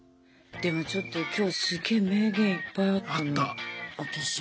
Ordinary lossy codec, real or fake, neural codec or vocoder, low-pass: none; real; none; none